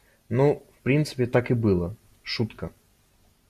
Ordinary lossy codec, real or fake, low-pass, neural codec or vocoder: MP3, 96 kbps; real; 14.4 kHz; none